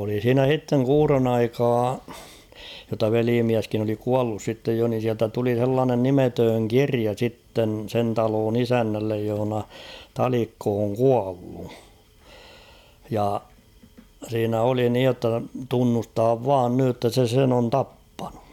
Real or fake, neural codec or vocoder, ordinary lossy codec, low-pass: real; none; none; 19.8 kHz